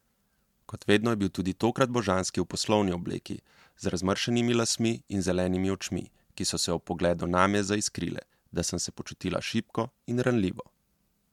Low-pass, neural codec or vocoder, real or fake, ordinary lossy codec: 19.8 kHz; none; real; MP3, 96 kbps